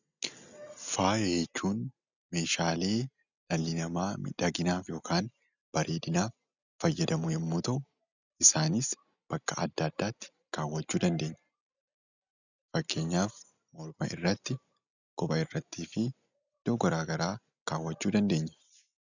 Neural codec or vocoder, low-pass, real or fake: none; 7.2 kHz; real